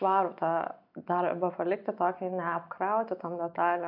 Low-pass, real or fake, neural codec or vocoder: 5.4 kHz; real; none